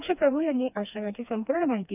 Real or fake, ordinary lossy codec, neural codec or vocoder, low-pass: fake; none; codec, 16 kHz, 2 kbps, FreqCodec, smaller model; 3.6 kHz